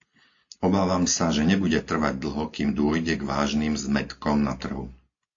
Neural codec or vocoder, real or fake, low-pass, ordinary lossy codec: none; real; 7.2 kHz; AAC, 48 kbps